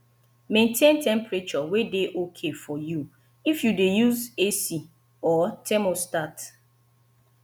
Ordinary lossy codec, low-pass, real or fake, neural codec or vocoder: none; none; real; none